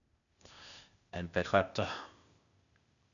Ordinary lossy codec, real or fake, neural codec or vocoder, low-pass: none; fake; codec, 16 kHz, 0.8 kbps, ZipCodec; 7.2 kHz